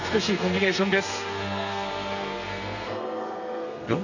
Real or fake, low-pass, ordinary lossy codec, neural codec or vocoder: fake; 7.2 kHz; none; codec, 32 kHz, 1.9 kbps, SNAC